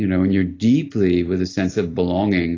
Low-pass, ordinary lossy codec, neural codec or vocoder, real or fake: 7.2 kHz; AAC, 32 kbps; none; real